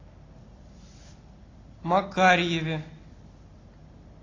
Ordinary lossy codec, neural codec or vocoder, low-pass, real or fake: AAC, 32 kbps; none; 7.2 kHz; real